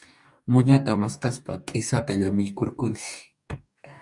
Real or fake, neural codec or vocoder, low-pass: fake; codec, 44.1 kHz, 2.6 kbps, DAC; 10.8 kHz